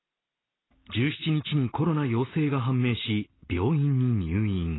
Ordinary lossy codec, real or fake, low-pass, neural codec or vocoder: AAC, 16 kbps; real; 7.2 kHz; none